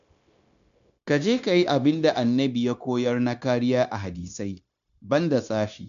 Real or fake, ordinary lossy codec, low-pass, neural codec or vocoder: fake; none; 7.2 kHz; codec, 16 kHz, 0.9 kbps, LongCat-Audio-Codec